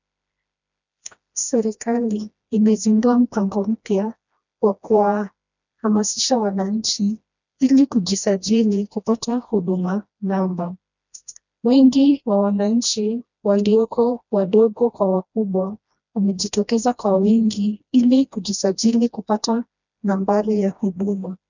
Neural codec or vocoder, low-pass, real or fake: codec, 16 kHz, 1 kbps, FreqCodec, smaller model; 7.2 kHz; fake